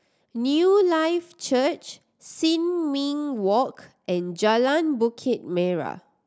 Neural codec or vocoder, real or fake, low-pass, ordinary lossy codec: none; real; none; none